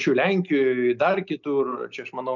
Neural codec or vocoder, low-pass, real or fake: none; 7.2 kHz; real